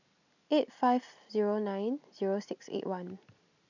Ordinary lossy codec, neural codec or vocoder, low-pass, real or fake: none; none; 7.2 kHz; real